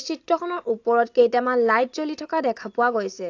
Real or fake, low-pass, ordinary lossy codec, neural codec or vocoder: real; 7.2 kHz; AAC, 48 kbps; none